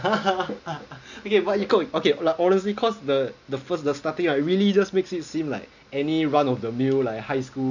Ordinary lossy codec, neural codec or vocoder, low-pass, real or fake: none; none; 7.2 kHz; real